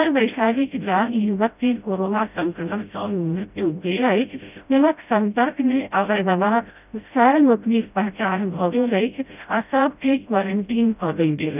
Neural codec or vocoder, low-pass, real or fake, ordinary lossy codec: codec, 16 kHz, 0.5 kbps, FreqCodec, smaller model; 3.6 kHz; fake; none